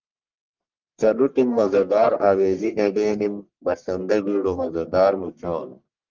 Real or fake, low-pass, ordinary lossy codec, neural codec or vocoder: fake; 7.2 kHz; Opus, 32 kbps; codec, 44.1 kHz, 1.7 kbps, Pupu-Codec